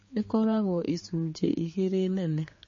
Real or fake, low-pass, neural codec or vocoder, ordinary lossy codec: fake; 7.2 kHz; codec, 16 kHz, 4 kbps, X-Codec, HuBERT features, trained on general audio; MP3, 32 kbps